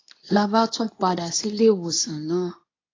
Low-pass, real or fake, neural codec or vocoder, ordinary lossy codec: 7.2 kHz; fake; codec, 24 kHz, 0.9 kbps, WavTokenizer, medium speech release version 2; AAC, 32 kbps